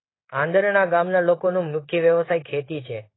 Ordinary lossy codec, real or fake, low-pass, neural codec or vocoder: AAC, 16 kbps; fake; 7.2 kHz; codec, 16 kHz in and 24 kHz out, 1 kbps, XY-Tokenizer